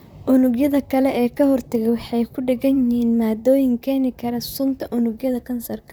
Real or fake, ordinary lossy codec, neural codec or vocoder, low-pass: fake; none; vocoder, 44.1 kHz, 128 mel bands, Pupu-Vocoder; none